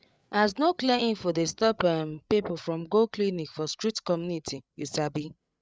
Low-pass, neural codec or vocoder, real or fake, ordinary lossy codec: none; codec, 16 kHz, 8 kbps, FreqCodec, larger model; fake; none